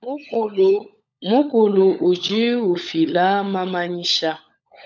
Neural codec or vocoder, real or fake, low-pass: codec, 16 kHz, 16 kbps, FunCodec, trained on LibriTTS, 50 frames a second; fake; 7.2 kHz